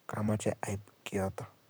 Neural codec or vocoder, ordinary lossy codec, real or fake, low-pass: vocoder, 44.1 kHz, 128 mel bands, Pupu-Vocoder; none; fake; none